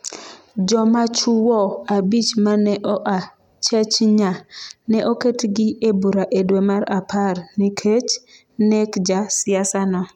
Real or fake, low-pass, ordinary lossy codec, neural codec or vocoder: real; 19.8 kHz; none; none